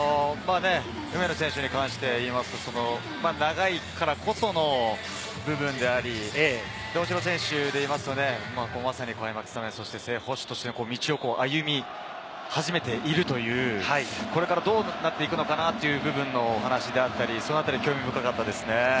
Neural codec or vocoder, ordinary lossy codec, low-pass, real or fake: none; none; none; real